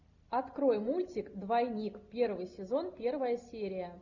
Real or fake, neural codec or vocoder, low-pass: real; none; 7.2 kHz